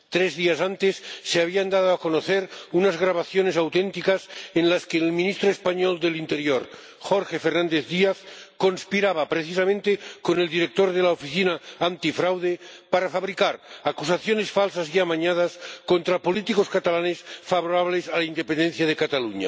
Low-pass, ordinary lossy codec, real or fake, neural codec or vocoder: none; none; real; none